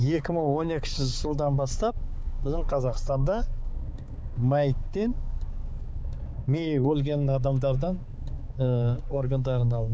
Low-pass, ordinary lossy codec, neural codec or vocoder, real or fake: none; none; codec, 16 kHz, 4 kbps, X-Codec, HuBERT features, trained on balanced general audio; fake